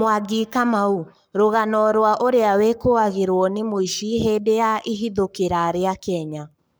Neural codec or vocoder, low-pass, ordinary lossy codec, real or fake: codec, 44.1 kHz, 7.8 kbps, Pupu-Codec; none; none; fake